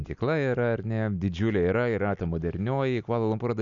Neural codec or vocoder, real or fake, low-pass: none; real; 7.2 kHz